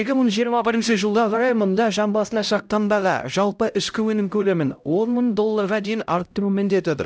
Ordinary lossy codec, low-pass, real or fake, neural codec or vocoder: none; none; fake; codec, 16 kHz, 0.5 kbps, X-Codec, HuBERT features, trained on LibriSpeech